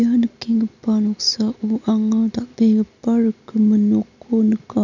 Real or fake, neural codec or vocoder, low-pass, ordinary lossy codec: real; none; 7.2 kHz; none